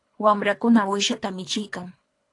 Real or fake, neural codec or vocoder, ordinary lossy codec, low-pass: fake; codec, 24 kHz, 3 kbps, HILCodec; AAC, 48 kbps; 10.8 kHz